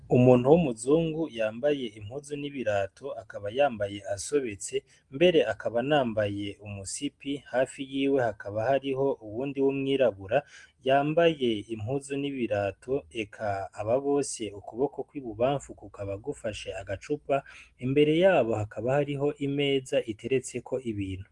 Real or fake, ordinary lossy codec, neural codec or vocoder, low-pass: real; Opus, 24 kbps; none; 10.8 kHz